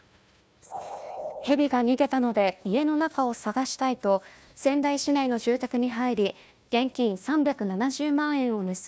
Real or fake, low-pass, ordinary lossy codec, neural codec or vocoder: fake; none; none; codec, 16 kHz, 1 kbps, FunCodec, trained on Chinese and English, 50 frames a second